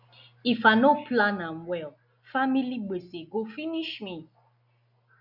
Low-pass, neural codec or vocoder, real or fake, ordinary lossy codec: 5.4 kHz; none; real; none